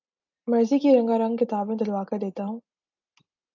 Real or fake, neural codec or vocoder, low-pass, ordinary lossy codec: real; none; 7.2 kHz; AAC, 48 kbps